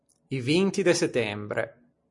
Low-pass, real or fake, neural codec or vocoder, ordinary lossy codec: 10.8 kHz; real; none; MP3, 48 kbps